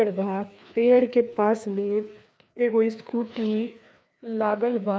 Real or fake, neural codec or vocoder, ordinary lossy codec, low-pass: fake; codec, 16 kHz, 2 kbps, FreqCodec, larger model; none; none